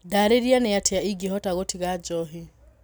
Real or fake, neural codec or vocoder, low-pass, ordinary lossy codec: real; none; none; none